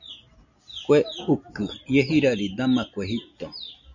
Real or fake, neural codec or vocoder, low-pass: real; none; 7.2 kHz